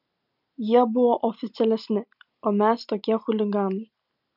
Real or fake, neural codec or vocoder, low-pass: real; none; 5.4 kHz